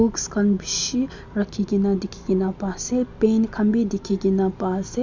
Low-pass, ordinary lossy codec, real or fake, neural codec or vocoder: 7.2 kHz; none; real; none